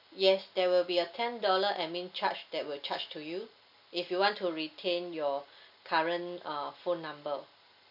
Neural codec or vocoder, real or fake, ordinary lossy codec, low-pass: none; real; none; 5.4 kHz